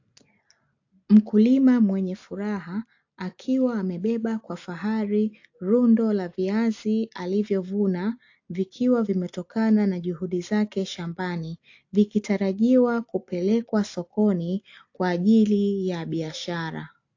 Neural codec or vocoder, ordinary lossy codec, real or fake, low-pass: none; AAC, 48 kbps; real; 7.2 kHz